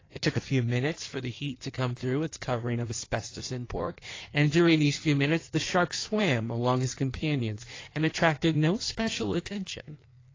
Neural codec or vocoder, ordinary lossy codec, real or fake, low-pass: codec, 16 kHz in and 24 kHz out, 1.1 kbps, FireRedTTS-2 codec; AAC, 32 kbps; fake; 7.2 kHz